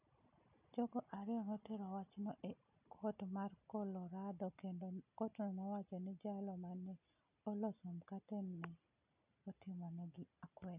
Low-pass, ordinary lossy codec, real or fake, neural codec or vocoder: 3.6 kHz; none; real; none